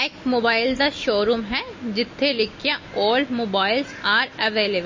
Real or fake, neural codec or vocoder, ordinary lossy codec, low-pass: real; none; MP3, 32 kbps; 7.2 kHz